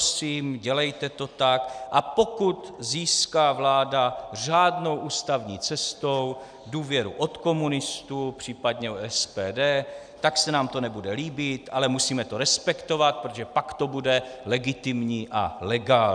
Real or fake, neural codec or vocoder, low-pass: real; none; 9.9 kHz